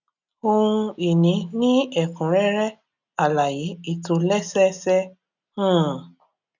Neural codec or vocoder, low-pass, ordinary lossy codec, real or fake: none; 7.2 kHz; none; real